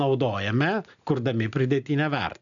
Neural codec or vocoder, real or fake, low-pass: none; real; 7.2 kHz